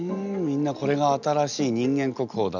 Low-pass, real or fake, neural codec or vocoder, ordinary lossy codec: 7.2 kHz; real; none; none